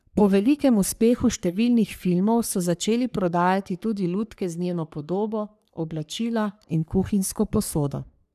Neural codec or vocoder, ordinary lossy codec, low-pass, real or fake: codec, 44.1 kHz, 3.4 kbps, Pupu-Codec; none; 14.4 kHz; fake